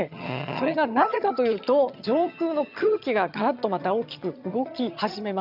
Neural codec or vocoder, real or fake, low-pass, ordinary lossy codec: vocoder, 22.05 kHz, 80 mel bands, HiFi-GAN; fake; 5.4 kHz; none